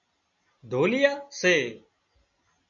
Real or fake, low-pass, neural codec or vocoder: real; 7.2 kHz; none